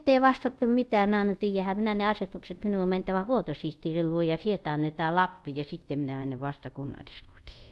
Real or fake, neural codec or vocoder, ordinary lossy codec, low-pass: fake; codec, 24 kHz, 0.5 kbps, DualCodec; none; none